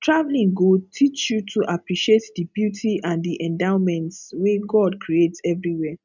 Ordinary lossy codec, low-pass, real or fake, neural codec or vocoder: none; 7.2 kHz; real; none